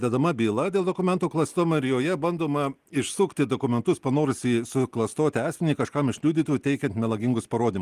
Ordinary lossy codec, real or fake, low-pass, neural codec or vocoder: Opus, 24 kbps; real; 14.4 kHz; none